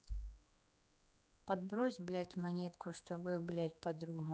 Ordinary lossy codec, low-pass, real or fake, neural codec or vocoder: none; none; fake; codec, 16 kHz, 2 kbps, X-Codec, HuBERT features, trained on general audio